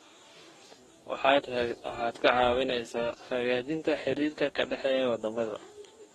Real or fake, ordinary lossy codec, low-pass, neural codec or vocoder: fake; AAC, 32 kbps; 19.8 kHz; codec, 44.1 kHz, 2.6 kbps, DAC